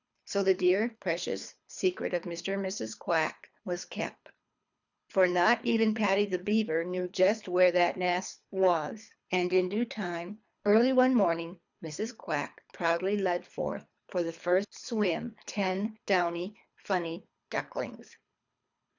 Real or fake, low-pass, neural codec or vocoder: fake; 7.2 kHz; codec, 24 kHz, 3 kbps, HILCodec